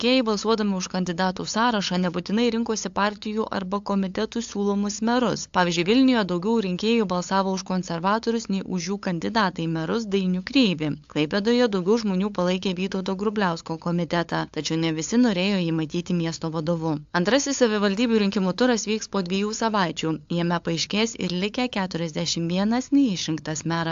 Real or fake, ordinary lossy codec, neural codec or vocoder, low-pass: fake; AAC, 64 kbps; codec, 16 kHz, 8 kbps, FunCodec, trained on LibriTTS, 25 frames a second; 7.2 kHz